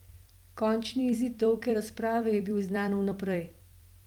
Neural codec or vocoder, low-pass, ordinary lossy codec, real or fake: vocoder, 44.1 kHz, 128 mel bands every 256 samples, BigVGAN v2; 19.8 kHz; Opus, 32 kbps; fake